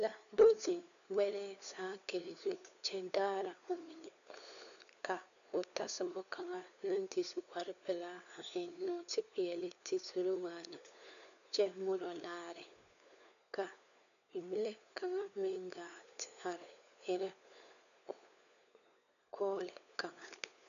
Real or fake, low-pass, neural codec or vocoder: fake; 7.2 kHz; codec, 16 kHz, 4 kbps, FunCodec, trained on LibriTTS, 50 frames a second